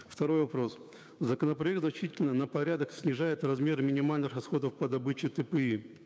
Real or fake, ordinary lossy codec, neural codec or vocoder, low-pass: fake; none; codec, 16 kHz, 6 kbps, DAC; none